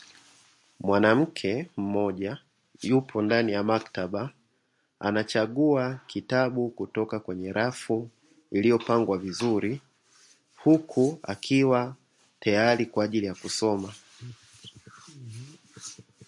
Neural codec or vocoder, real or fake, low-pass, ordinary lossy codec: none; real; 10.8 kHz; MP3, 48 kbps